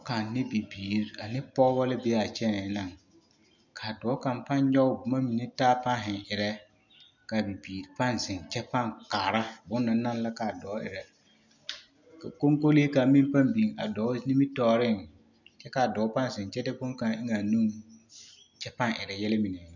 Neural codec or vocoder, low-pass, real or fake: none; 7.2 kHz; real